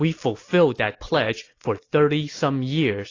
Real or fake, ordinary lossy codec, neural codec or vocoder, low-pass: real; AAC, 32 kbps; none; 7.2 kHz